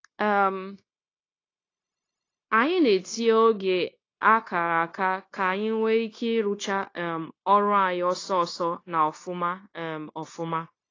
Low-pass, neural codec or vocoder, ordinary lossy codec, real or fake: 7.2 kHz; codec, 16 kHz, 0.9 kbps, LongCat-Audio-Codec; AAC, 32 kbps; fake